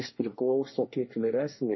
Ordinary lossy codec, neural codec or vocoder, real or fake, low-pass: MP3, 24 kbps; codec, 24 kHz, 1 kbps, SNAC; fake; 7.2 kHz